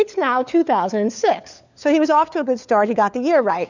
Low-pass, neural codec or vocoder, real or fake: 7.2 kHz; codec, 16 kHz, 4 kbps, FunCodec, trained on LibriTTS, 50 frames a second; fake